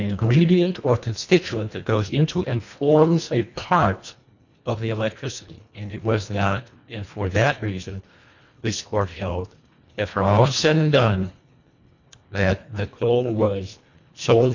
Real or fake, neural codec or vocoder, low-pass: fake; codec, 24 kHz, 1.5 kbps, HILCodec; 7.2 kHz